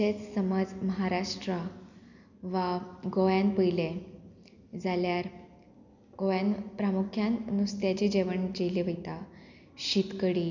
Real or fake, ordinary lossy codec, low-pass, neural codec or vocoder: real; none; 7.2 kHz; none